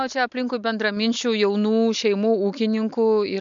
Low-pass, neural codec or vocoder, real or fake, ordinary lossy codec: 7.2 kHz; none; real; MP3, 64 kbps